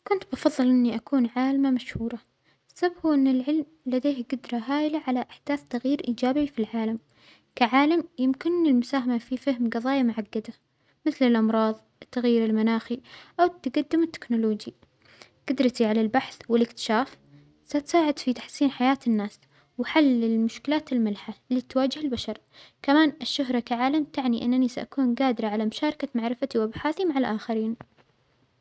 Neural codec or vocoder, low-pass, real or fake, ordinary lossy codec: none; none; real; none